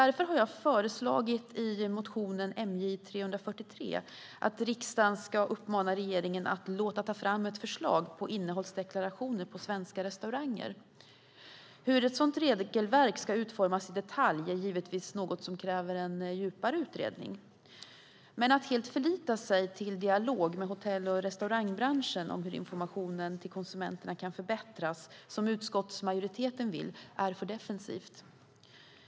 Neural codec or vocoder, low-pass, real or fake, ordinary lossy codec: none; none; real; none